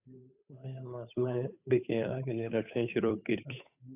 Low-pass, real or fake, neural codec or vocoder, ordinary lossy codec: 3.6 kHz; fake; codec, 16 kHz, 8 kbps, FunCodec, trained on Chinese and English, 25 frames a second; AAC, 32 kbps